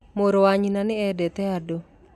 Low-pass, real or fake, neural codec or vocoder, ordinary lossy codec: 14.4 kHz; real; none; none